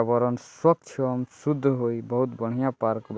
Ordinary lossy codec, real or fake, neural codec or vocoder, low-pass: none; real; none; none